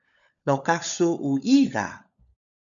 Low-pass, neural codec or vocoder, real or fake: 7.2 kHz; codec, 16 kHz, 16 kbps, FunCodec, trained on LibriTTS, 50 frames a second; fake